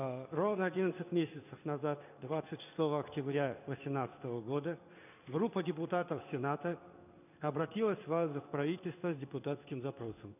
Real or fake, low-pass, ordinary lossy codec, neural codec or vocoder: fake; 3.6 kHz; none; codec, 16 kHz in and 24 kHz out, 1 kbps, XY-Tokenizer